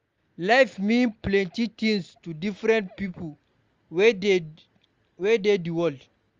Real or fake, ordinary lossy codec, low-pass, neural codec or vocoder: real; Opus, 32 kbps; 7.2 kHz; none